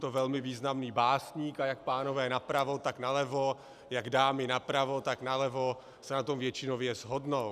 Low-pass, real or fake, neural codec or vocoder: 14.4 kHz; real; none